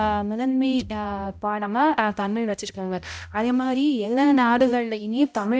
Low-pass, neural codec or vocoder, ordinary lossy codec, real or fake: none; codec, 16 kHz, 0.5 kbps, X-Codec, HuBERT features, trained on balanced general audio; none; fake